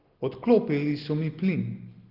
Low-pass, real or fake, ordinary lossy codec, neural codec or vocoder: 5.4 kHz; real; Opus, 16 kbps; none